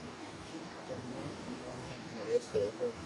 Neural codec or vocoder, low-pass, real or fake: codec, 44.1 kHz, 2.6 kbps, DAC; 10.8 kHz; fake